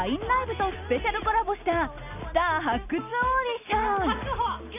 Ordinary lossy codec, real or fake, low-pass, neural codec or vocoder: none; real; 3.6 kHz; none